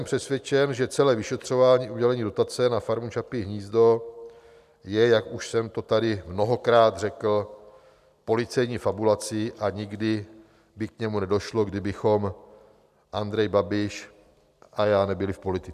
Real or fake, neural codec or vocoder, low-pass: real; none; 14.4 kHz